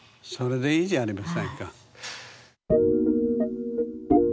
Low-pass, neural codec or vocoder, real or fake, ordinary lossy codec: none; none; real; none